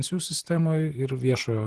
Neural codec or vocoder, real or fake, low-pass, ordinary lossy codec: vocoder, 44.1 kHz, 128 mel bands every 512 samples, BigVGAN v2; fake; 10.8 kHz; Opus, 16 kbps